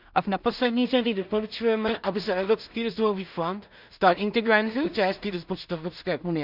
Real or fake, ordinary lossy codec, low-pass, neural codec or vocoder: fake; none; 5.4 kHz; codec, 16 kHz in and 24 kHz out, 0.4 kbps, LongCat-Audio-Codec, two codebook decoder